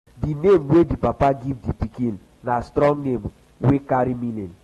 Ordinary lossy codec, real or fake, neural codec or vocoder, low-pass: AAC, 32 kbps; real; none; 19.8 kHz